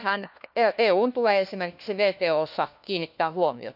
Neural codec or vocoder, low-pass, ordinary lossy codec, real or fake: codec, 16 kHz, 1 kbps, FunCodec, trained on LibriTTS, 50 frames a second; 5.4 kHz; none; fake